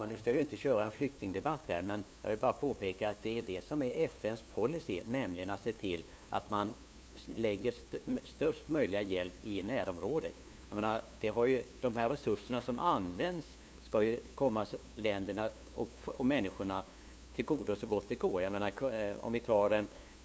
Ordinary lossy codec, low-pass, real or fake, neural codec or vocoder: none; none; fake; codec, 16 kHz, 2 kbps, FunCodec, trained on LibriTTS, 25 frames a second